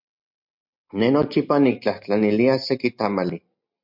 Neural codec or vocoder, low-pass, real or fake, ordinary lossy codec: none; 5.4 kHz; real; MP3, 48 kbps